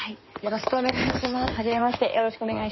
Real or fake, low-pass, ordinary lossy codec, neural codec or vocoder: fake; 7.2 kHz; MP3, 24 kbps; codec, 16 kHz in and 24 kHz out, 2.2 kbps, FireRedTTS-2 codec